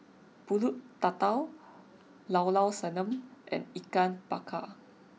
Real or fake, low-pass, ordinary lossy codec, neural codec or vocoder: real; none; none; none